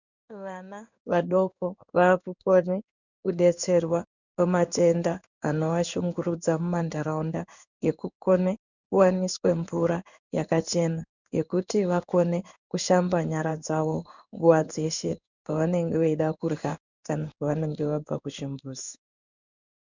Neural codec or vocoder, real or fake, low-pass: codec, 16 kHz in and 24 kHz out, 1 kbps, XY-Tokenizer; fake; 7.2 kHz